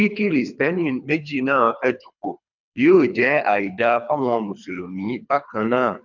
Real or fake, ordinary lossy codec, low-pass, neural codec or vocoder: fake; none; 7.2 kHz; codec, 24 kHz, 3 kbps, HILCodec